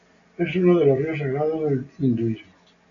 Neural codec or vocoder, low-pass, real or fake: none; 7.2 kHz; real